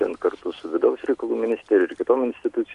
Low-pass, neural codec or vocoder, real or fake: 10.8 kHz; none; real